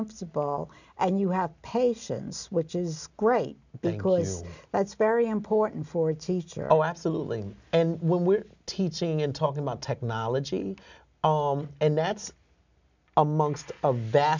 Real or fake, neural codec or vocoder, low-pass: real; none; 7.2 kHz